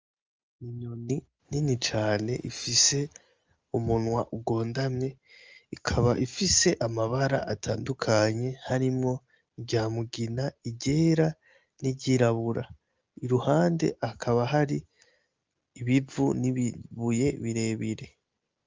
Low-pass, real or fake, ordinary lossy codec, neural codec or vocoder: 7.2 kHz; real; Opus, 24 kbps; none